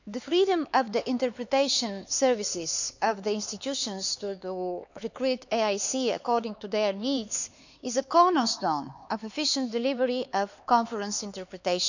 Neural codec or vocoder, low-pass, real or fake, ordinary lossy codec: codec, 16 kHz, 4 kbps, X-Codec, HuBERT features, trained on LibriSpeech; 7.2 kHz; fake; none